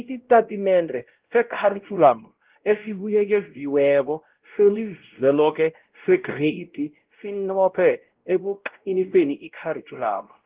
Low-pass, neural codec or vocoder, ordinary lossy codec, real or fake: 3.6 kHz; codec, 16 kHz, 0.5 kbps, X-Codec, WavLM features, trained on Multilingual LibriSpeech; Opus, 16 kbps; fake